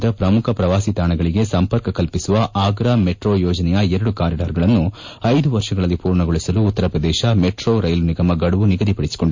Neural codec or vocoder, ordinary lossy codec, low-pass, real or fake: none; MP3, 48 kbps; 7.2 kHz; real